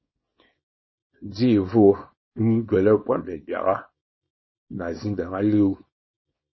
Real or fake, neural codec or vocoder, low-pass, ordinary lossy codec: fake; codec, 24 kHz, 0.9 kbps, WavTokenizer, small release; 7.2 kHz; MP3, 24 kbps